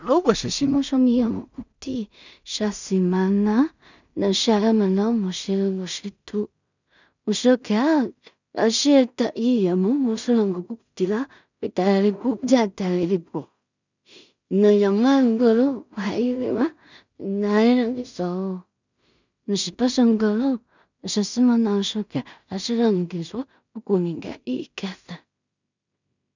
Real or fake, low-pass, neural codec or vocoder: fake; 7.2 kHz; codec, 16 kHz in and 24 kHz out, 0.4 kbps, LongCat-Audio-Codec, two codebook decoder